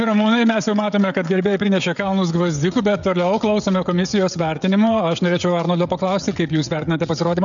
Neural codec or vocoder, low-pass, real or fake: codec, 16 kHz, 16 kbps, FreqCodec, smaller model; 7.2 kHz; fake